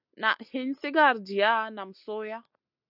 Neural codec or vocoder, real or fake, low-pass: none; real; 5.4 kHz